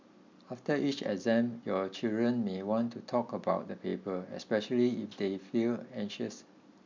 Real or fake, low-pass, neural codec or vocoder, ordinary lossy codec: real; 7.2 kHz; none; MP3, 64 kbps